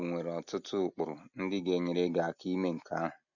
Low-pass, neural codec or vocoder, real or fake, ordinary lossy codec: 7.2 kHz; none; real; none